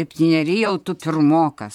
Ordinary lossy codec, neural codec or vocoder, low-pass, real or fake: MP3, 96 kbps; vocoder, 44.1 kHz, 128 mel bands, Pupu-Vocoder; 19.8 kHz; fake